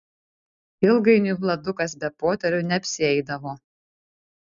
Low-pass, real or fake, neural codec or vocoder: 7.2 kHz; real; none